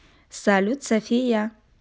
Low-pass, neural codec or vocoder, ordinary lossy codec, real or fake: none; none; none; real